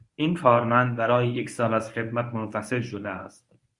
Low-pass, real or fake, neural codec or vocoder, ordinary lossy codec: 10.8 kHz; fake; codec, 24 kHz, 0.9 kbps, WavTokenizer, medium speech release version 1; Opus, 64 kbps